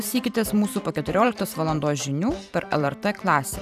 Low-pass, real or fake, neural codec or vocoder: 14.4 kHz; fake; vocoder, 44.1 kHz, 128 mel bands every 512 samples, BigVGAN v2